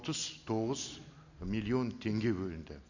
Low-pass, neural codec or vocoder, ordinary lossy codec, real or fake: 7.2 kHz; none; none; real